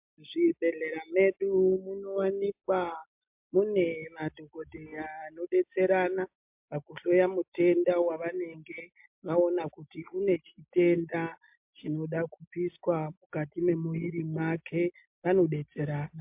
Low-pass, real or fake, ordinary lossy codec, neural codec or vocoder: 3.6 kHz; real; MP3, 32 kbps; none